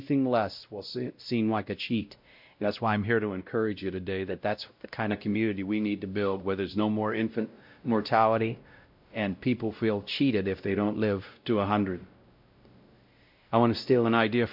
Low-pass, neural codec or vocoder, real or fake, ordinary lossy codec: 5.4 kHz; codec, 16 kHz, 0.5 kbps, X-Codec, WavLM features, trained on Multilingual LibriSpeech; fake; MP3, 48 kbps